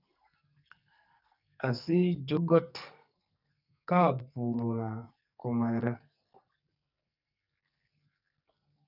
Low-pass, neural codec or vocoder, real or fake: 5.4 kHz; codec, 44.1 kHz, 2.6 kbps, SNAC; fake